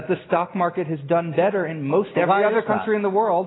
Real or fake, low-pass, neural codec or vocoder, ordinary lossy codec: real; 7.2 kHz; none; AAC, 16 kbps